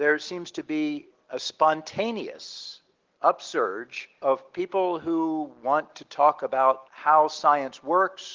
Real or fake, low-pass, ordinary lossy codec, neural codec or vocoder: real; 7.2 kHz; Opus, 16 kbps; none